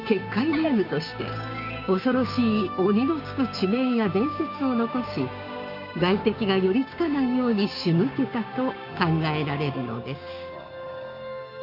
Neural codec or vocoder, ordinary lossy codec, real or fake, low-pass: codec, 16 kHz, 6 kbps, DAC; none; fake; 5.4 kHz